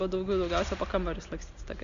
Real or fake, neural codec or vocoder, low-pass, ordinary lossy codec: real; none; 7.2 kHz; MP3, 64 kbps